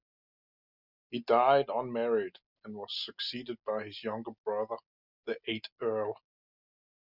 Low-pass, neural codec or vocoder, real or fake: 5.4 kHz; none; real